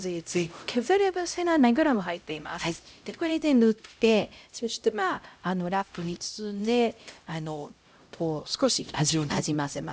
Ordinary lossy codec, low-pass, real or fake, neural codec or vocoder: none; none; fake; codec, 16 kHz, 0.5 kbps, X-Codec, HuBERT features, trained on LibriSpeech